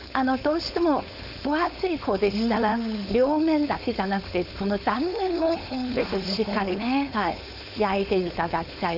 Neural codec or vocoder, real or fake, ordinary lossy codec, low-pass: codec, 16 kHz, 4.8 kbps, FACodec; fake; none; 5.4 kHz